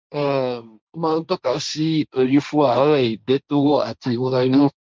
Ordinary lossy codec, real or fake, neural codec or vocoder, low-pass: none; fake; codec, 16 kHz, 1.1 kbps, Voila-Tokenizer; none